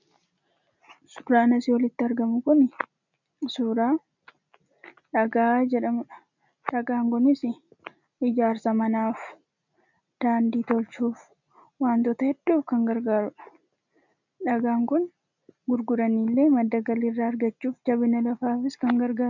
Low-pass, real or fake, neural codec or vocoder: 7.2 kHz; real; none